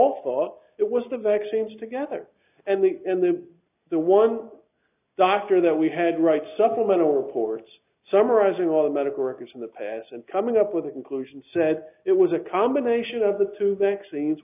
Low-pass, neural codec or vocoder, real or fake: 3.6 kHz; none; real